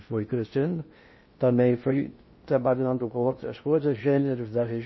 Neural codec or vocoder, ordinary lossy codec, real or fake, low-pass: codec, 16 kHz in and 24 kHz out, 0.6 kbps, FocalCodec, streaming, 2048 codes; MP3, 24 kbps; fake; 7.2 kHz